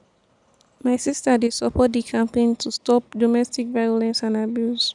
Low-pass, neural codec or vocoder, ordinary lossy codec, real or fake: 10.8 kHz; none; none; real